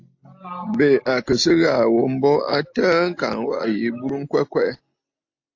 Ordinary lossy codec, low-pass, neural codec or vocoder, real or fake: AAC, 48 kbps; 7.2 kHz; none; real